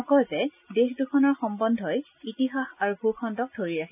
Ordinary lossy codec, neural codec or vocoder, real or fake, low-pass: none; none; real; 3.6 kHz